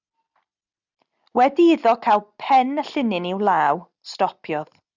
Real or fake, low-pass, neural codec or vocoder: real; 7.2 kHz; none